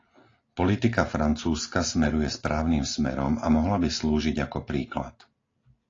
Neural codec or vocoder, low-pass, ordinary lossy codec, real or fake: none; 7.2 kHz; AAC, 32 kbps; real